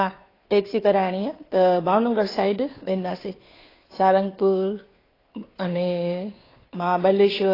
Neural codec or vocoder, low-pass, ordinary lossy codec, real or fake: codec, 16 kHz, 2 kbps, FunCodec, trained on Chinese and English, 25 frames a second; 5.4 kHz; AAC, 24 kbps; fake